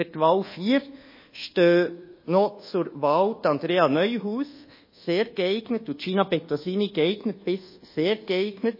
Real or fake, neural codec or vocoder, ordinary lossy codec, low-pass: fake; codec, 24 kHz, 1.2 kbps, DualCodec; MP3, 24 kbps; 5.4 kHz